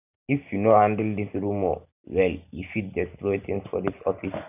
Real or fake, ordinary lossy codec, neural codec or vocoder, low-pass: real; none; none; 3.6 kHz